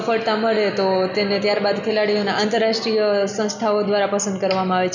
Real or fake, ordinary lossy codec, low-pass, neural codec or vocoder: real; none; 7.2 kHz; none